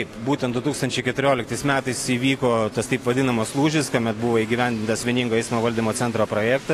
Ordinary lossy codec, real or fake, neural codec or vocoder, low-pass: AAC, 48 kbps; real; none; 14.4 kHz